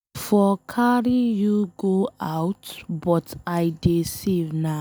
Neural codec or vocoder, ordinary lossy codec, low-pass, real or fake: none; none; none; real